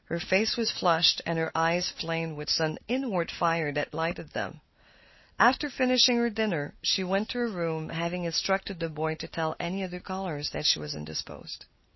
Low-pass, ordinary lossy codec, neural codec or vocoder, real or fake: 7.2 kHz; MP3, 24 kbps; none; real